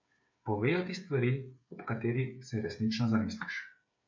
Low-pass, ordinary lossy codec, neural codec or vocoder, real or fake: 7.2 kHz; MP3, 48 kbps; codec, 16 kHz, 8 kbps, FreqCodec, smaller model; fake